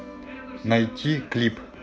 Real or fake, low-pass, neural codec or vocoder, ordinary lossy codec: real; none; none; none